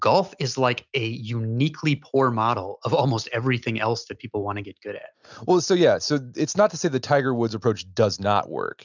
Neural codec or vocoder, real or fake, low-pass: none; real; 7.2 kHz